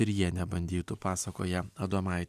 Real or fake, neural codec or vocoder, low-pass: real; none; 14.4 kHz